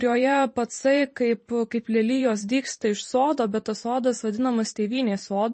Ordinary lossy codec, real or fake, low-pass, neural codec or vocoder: MP3, 32 kbps; fake; 10.8 kHz; vocoder, 44.1 kHz, 128 mel bands every 512 samples, BigVGAN v2